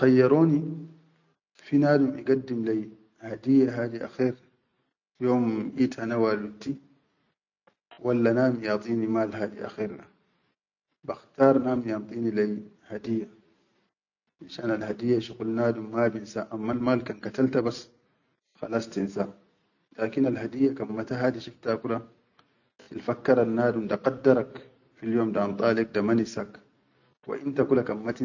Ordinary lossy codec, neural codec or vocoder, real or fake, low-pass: none; none; real; 7.2 kHz